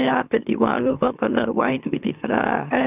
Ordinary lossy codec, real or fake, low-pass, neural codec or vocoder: none; fake; 3.6 kHz; autoencoder, 44.1 kHz, a latent of 192 numbers a frame, MeloTTS